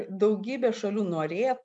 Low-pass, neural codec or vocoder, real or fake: 10.8 kHz; none; real